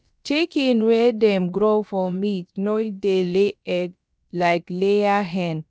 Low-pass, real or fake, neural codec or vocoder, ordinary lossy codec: none; fake; codec, 16 kHz, about 1 kbps, DyCAST, with the encoder's durations; none